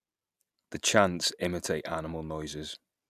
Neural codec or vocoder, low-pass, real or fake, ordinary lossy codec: none; 14.4 kHz; real; none